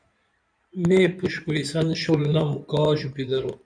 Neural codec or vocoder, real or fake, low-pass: codec, 16 kHz in and 24 kHz out, 2.2 kbps, FireRedTTS-2 codec; fake; 9.9 kHz